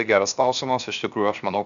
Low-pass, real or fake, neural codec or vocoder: 7.2 kHz; fake; codec, 16 kHz, 0.7 kbps, FocalCodec